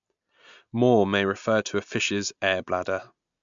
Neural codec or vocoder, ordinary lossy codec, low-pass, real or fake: none; MP3, 64 kbps; 7.2 kHz; real